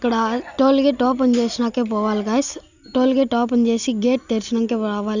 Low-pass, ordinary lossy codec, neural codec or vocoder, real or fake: 7.2 kHz; none; none; real